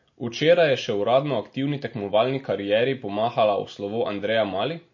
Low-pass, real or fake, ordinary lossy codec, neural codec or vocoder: 7.2 kHz; real; MP3, 32 kbps; none